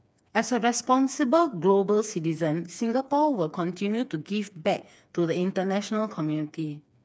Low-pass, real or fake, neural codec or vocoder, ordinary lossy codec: none; fake; codec, 16 kHz, 4 kbps, FreqCodec, smaller model; none